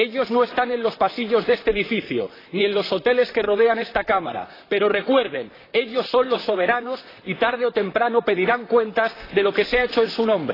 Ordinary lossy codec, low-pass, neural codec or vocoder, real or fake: AAC, 24 kbps; 5.4 kHz; vocoder, 44.1 kHz, 128 mel bands, Pupu-Vocoder; fake